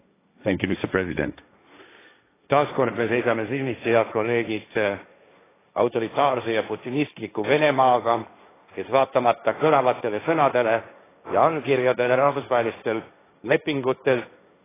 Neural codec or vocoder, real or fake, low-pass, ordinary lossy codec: codec, 16 kHz, 1.1 kbps, Voila-Tokenizer; fake; 3.6 kHz; AAC, 16 kbps